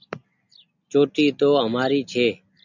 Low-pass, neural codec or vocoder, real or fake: 7.2 kHz; none; real